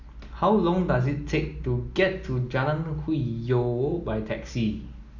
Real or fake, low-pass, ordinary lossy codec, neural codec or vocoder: real; 7.2 kHz; none; none